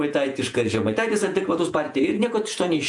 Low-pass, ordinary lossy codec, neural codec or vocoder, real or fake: 10.8 kHz; MP3, 64 kbps; vocoder, 48 kHz, 128 mel bands, Vocos; fake